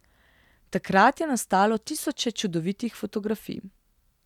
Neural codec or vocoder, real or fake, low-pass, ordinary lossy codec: none; real; 19.8 kHz; none